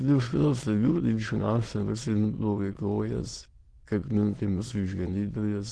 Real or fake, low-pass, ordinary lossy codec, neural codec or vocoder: fake; 9.9 kHz; Opus, 16 kbps; autoencoder, 22.05 kHz, a latent of 192 numbers a frame, VITS, trained on many speakers